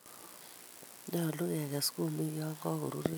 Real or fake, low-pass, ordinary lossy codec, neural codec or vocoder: real; none; none; none